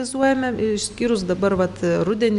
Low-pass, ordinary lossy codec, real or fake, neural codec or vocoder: 10.8 kHz; Opus, 64 kbps; real; none